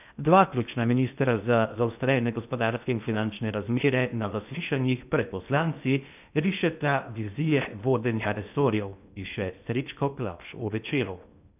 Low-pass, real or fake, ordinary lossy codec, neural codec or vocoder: 3.6 kHz; fake; none; codec, 16 kHz in and 24 kHz out, 0.6 kbps, FocalCodec, streaming, 2048 codes